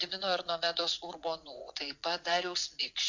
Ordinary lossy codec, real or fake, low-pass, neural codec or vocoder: MP3, 48 kbps; fake; 7.2 kHz; vocoder, 22.05 kHz, 80 mel bands, WaveNeXt